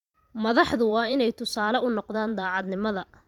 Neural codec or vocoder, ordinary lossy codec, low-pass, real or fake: vocoder, 44.1 kHz, 128 mel bands every 512 samples, BigVGAN v2; none; 19.8 kHz; fake